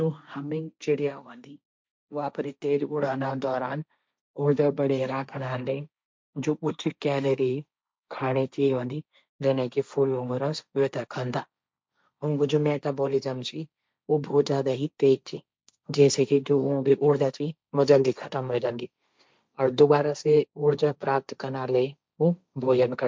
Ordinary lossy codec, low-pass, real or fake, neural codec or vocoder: none; none; fake; codec, 16 kHz, 1.1 kbps, Voila-Tokenizer